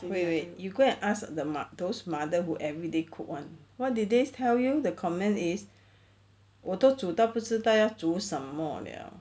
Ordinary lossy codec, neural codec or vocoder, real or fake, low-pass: none; none; real; none